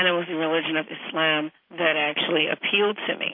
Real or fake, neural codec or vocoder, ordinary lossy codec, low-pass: real; none; MP3, 32 kbps; 5.4 kHz